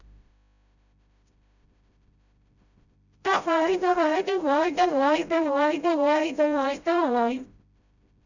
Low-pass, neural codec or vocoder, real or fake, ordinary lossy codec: 7.2 kHz; codec, 16 kHz, 0.5 kbps, FreqCodec, smaller model; fake; none